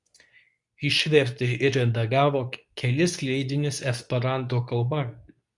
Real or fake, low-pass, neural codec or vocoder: fake; 10.8 kHz; codec, 24 kHz, 0.9 kbps, WavTokenizer, medium speech release version 2